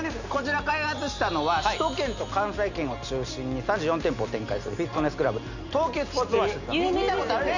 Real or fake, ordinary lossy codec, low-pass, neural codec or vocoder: real; none; 7.2 kHz; none